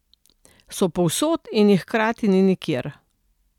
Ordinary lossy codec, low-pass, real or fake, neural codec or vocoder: none; 19.8 kHz; real; none